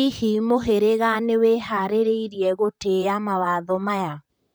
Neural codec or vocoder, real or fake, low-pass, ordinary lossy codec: vocoder, 44.1 kHz, 128 mel bands, Pupu-Vocoder; fake; none; none